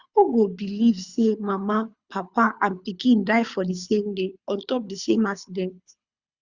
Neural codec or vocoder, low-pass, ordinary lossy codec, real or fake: codec, 24 kHz, 6 kbps, HILCodec; 7.2 kHz; Opus, 64 kbps; fake